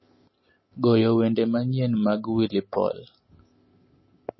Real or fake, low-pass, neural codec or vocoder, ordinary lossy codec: real; 7.2 kHz; none; MP3, 24 kbps